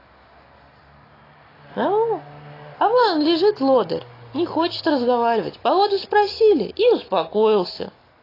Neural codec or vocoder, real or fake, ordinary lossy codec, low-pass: none; real; AAC, 24 kbps; 5.4 kHz